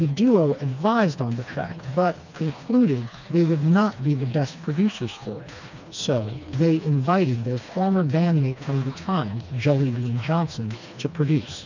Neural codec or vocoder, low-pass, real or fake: codec, 16 kHz, 2 kbps, FreqCodec, smaller model; 7.2 kHz; fake